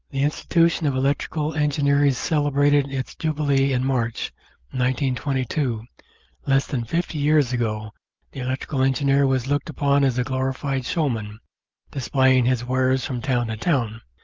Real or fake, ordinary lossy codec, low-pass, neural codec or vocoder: real; Opus, 24 kbps; 7.2 kHz; none